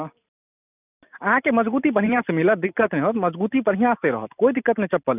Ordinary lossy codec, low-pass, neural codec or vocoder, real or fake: none; 3.6 kHz; none; real